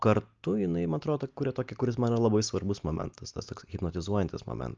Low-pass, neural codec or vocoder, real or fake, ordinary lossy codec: 7.2 kHz; none; real; Opus, 24 kbps